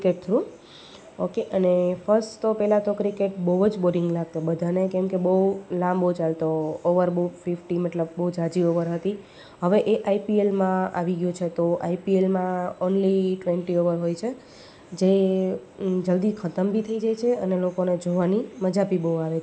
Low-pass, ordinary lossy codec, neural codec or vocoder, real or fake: none; none; none; real